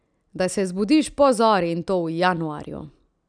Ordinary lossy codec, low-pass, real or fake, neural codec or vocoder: none; 9.9 kHz; real; none